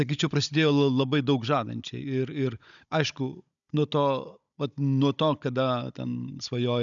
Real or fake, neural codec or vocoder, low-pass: fake; codec, 16 kHz, 16 kbps, FunCodec, trained on Chinese and English, 50 frames a second; 7.2 kHz